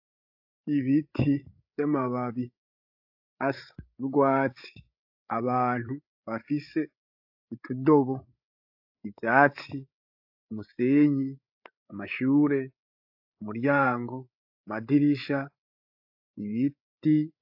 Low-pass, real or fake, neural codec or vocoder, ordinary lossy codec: 5.4 kHz; fake; codec, 16 kHz, 8 kbps, FreqCodec, larger model; AAC, 48 kbps